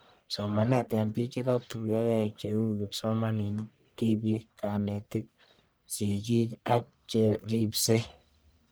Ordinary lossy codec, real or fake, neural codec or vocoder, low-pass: none; fake; codec, 44.1 kHz, 1.7 kbps, Pupu-Codec; none